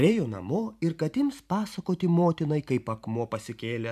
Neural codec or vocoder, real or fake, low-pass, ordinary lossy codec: vocoder, 44.1 kHz, 128 mel bands every 512 samples, BigVGAN v2; fake; 14.4 kHz; AAC, 96 kbps